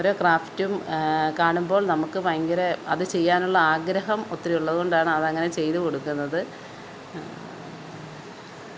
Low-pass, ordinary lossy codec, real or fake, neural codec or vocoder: none; none; real; none